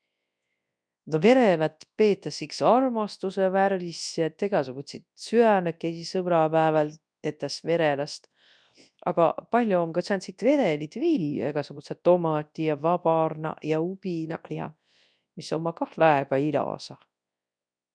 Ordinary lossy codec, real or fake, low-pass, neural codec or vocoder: none; fake; 9.9 kHz; codec, 24 kHz, 0.9 kbps, WavTokenizer, large speech release